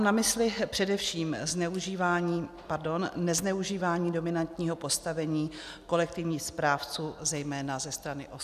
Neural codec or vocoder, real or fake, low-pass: none; real; 14.4 kHz